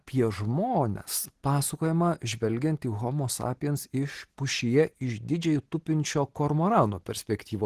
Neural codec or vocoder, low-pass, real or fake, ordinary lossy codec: none; 14.4 kHz; real; Opus, 16 kbps